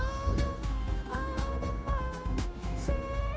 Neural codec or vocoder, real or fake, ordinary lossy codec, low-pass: codec, 16 kHz, 0.9 kbps, LongCat-Audio-Codec; fake; none; none